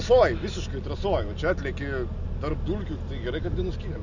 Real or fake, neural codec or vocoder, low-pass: real; none; 7.2 kHz